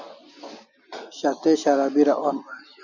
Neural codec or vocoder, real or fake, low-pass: none; real; 7.2 kHz